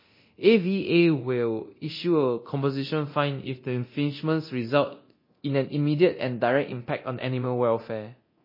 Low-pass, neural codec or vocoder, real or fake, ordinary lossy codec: 5.4 kHz; codec, 24 kHz, 0.9 kbps, DualCodec; fake; MP3, 24 kbps